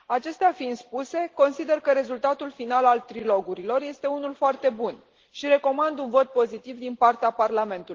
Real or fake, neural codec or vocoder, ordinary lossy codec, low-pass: real; none; Opus, 16 kbps; 7.2 kHz